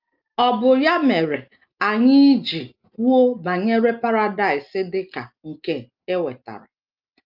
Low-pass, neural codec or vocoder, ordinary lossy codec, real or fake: 5.4 kHz; none; Opus, 24 kbps; real